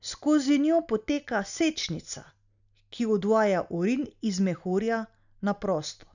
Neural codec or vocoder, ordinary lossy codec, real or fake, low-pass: none; none; real; 7.2 kHz